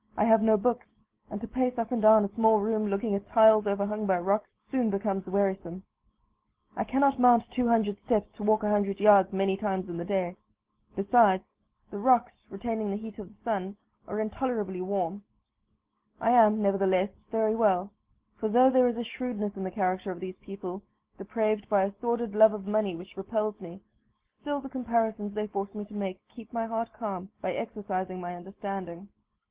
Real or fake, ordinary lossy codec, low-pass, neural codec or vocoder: real; Opus, 16 kbps; 3.6 kHz; none